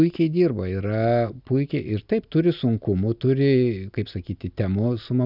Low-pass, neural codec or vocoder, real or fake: 5.4 kHz; none; real